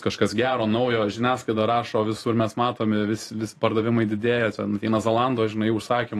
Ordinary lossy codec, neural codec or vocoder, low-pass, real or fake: AAC, 48 kbps; vocoder, 44.1 kHz, 128 mel bands every 512 samples, BigVGAN v2; 14.4 kHz; fake